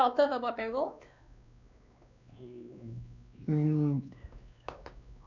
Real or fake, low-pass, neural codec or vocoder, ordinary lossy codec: fake; 7.2 kHz; codec, 16 kHz, 2 kbps, X-Codec, WavLM features, trained on Multilingual LibriSpeech; none